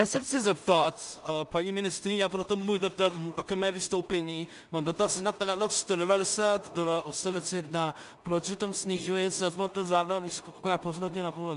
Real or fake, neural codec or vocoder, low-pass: fake; codec, 16 kHz in and 24 kHz out, 0.4 kbps, LongCat-Audio-Codec, two codebook decoder; 10.8 kHz